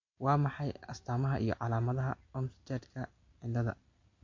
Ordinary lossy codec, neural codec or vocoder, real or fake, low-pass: MP3, 48 kbps; none; real; 7.2 kHz